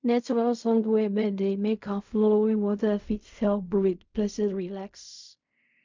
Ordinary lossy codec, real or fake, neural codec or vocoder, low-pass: MP3, 64 kbps; fake; codec, 16 kHz in and 24 kHz out, 0.4 kbps, LongCat-Audio-Codec, fine tuned four codebook decoder; 7.2 kHz